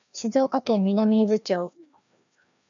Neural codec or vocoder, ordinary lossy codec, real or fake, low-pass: codec, 16 kHz, 1 kbps, FreqCodec, larger model; MP3, 96 kbps; fake; 7.2 kHz